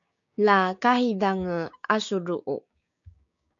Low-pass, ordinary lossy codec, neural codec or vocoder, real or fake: 7.2 kHz; MP3, 48 kbps; codec, 16 kHz, 6 kbps, DAC; fake